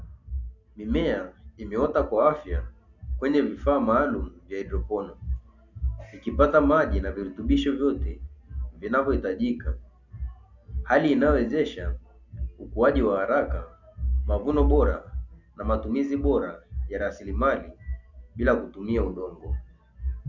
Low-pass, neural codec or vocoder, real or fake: 7.2 kHz; none; real